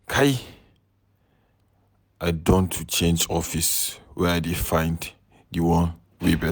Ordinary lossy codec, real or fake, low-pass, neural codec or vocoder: none; real; none; none